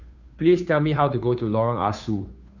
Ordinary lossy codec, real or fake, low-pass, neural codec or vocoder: none; fake; 7.2 kHz; codec, 16 kHz, 2 kbps, FunCodec, trained on Chinese and English, 25 frames a second